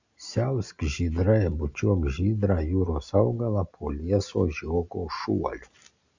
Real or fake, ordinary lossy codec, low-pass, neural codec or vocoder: real; Opus, 64 kbps; 7.2 kHz; none